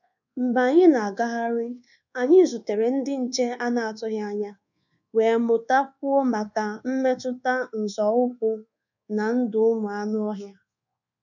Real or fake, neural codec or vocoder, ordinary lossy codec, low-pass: fake; codec, 24 kHz, 1.2 kbps, DualCodec; none; 7.2 kHz